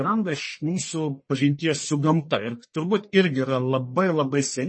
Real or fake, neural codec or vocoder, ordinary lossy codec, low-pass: fake; codec, 44.1 kHz, 1.7 kbps, Pupu-Codec; MP3, 32 kbps; 9.9 kHz